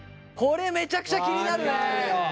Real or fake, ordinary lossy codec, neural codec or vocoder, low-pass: real; none; none; none